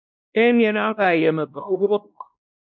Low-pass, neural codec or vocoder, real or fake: 7.2 kHz; codec, 16 kHz, 1 kbps, X-Codec, WavLM features, trained on Multilingual LibriSpeech; fake